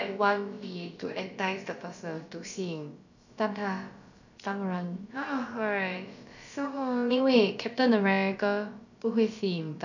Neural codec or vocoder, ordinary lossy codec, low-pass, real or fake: codec, 16 kHz, about 1 kbps, DyCAST, with the encoder's durations; none; 7.2 kHz; fake